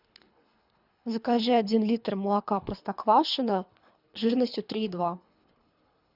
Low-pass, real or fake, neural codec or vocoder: 5.4 kHz; fake; codec, 24 kHz, 3 kbps, HILCodec